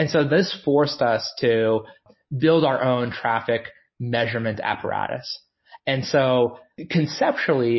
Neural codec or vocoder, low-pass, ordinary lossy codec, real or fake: none; 7.2 kHz; MP3, 24 kbps; real